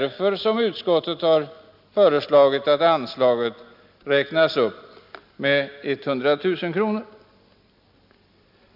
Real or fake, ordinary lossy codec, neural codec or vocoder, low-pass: real; none; none; 5.4 kHz